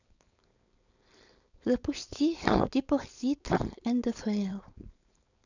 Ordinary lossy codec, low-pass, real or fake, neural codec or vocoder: none; 7.2 kHz; fake; codec, 16 kHz, 4.8 kbps, FACodec